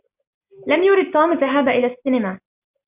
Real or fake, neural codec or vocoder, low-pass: real; none; 3.6 kHz